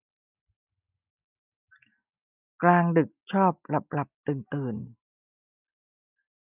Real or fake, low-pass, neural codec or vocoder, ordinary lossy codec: real; 3.6 kHz; none; none